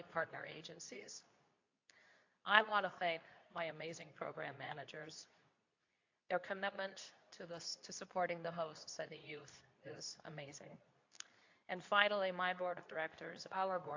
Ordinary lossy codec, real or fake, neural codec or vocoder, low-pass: AAC, 48 kbps; fake; codec, 24 kHz, 0.9 kbps, WavTokenizer, medium speech release version 2; 7.2 kHz